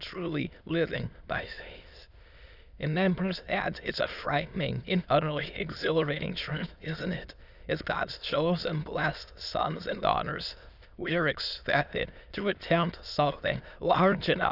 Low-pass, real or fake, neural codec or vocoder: 5.4 kHz; fake; autoencoder, 22.05 kHz, a latent of 192 numbers a frame, VITS, trained on many speakers